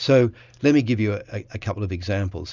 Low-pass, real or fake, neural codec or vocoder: 7.2 kHz; real; none